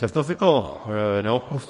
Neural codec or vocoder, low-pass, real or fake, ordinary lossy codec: codec, 24 kHz, 0.9 kbps, WavTokenizer, small release; 10.8 kHz; fake; MP3, 48 kbps